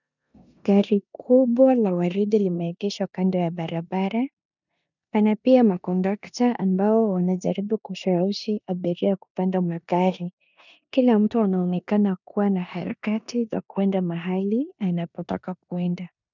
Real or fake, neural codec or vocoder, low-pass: fake; codec, 16 kHz in and 24 kHz out, 0.9 kbps, LongCat-Audio-Codec, four codebook decoder; 7.2 kHz